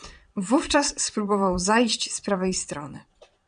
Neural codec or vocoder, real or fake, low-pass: vocoder, 22.05 kHz, 80 mel bands, Vocos; fake; 9.9 kHz